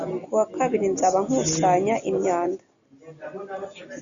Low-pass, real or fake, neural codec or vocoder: 7.2 kHz; real; none